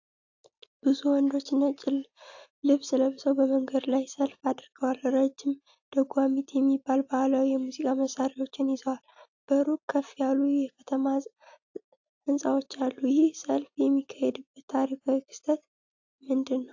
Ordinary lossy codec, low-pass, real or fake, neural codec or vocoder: AAC, 48 kbps; 7.2 kHz; real; none